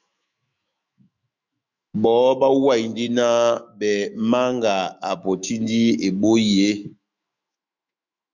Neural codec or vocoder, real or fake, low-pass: autoencoder, 48 kHz, 128 numbers a frame, DAC-VAE, trained on Japanese speech; fake; 7.2 kHz